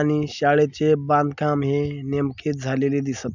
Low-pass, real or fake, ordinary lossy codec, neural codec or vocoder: 7.2 kHz; real; none; none